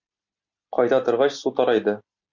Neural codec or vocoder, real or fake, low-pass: none; real; 7.2 kHz